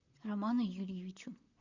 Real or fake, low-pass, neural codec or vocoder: fake; 7.2 kHz; vocoder, 44.1 kHz, 128 mel bands, Pupu-Vocoder